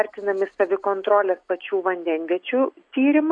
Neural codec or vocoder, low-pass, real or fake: none; 9.9 kHz; real